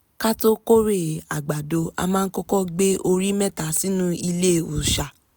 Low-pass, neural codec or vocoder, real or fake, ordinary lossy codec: none; none; real; none